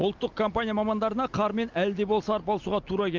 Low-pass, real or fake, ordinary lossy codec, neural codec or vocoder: 7.2 kHz; real; Opus, 32 kbps; none